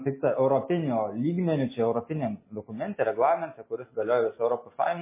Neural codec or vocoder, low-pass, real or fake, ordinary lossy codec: none; 3.6 kHz; real; MP3, 16 kbps